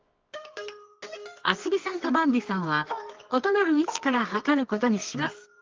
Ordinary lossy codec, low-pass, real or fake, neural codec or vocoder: Opus, 32 kbps; 7.2 kHz; fake; codec, 24 kHz, 0.9 kbps, WavTokenizer, medium music audio release